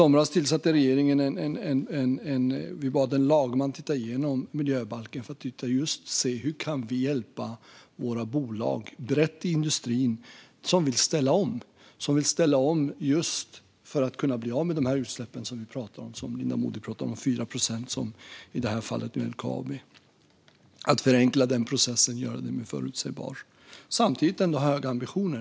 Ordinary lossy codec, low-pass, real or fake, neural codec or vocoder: none; none; real; none